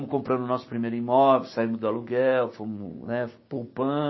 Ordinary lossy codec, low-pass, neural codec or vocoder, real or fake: MP3, 24 kbps; 7.2 kHz; codec, 16 kHz, 6 kbps, DAC; fake